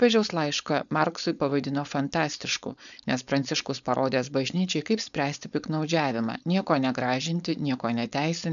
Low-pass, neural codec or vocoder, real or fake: 7.2 kHz; codec, 16 kHz, 4.8 kbps, FACodec; fake